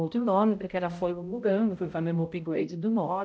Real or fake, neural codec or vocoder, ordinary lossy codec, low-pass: fake; codec, 16 kHz, 0.5 kbps, X-Codec, HuBERT features, trained on balanced general audio; none; none